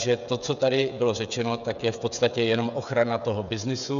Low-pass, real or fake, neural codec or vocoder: 7.2 kHz; fake; codec, 16 kHz, 16 kbps, FreqCodec, smaller model